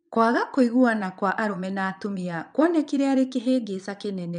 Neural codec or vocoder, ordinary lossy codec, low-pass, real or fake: vocoder, 22.05 kHz, 80 mel bands, Vocos; none; 9.9 kHz; fake